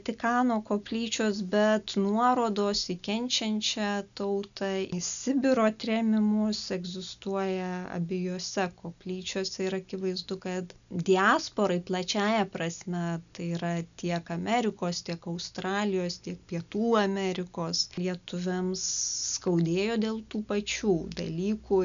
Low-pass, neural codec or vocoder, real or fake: 7.2 kHz; none; real